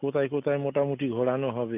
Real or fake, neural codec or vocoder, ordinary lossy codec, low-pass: real; none; none; 3.6 kHz